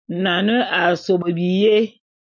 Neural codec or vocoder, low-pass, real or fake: none; 7.2 kHz; real